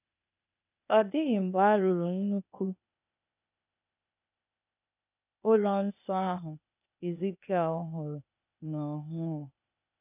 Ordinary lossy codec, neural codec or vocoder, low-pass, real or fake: none; codec, 16 kHz, 0.8 kbps, ZipCodec; 3.6 kHz; fake